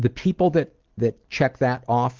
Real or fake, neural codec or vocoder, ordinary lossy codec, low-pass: real; none; Opus, 16 kbps; 7.2 kHz